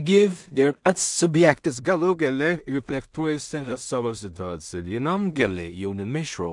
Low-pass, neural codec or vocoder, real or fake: 10.8 kHz; codec, 16 kHz in and 24 kHz out, 0.4 kbps, LongCat-Audio-Codec, two codebook decoder; fake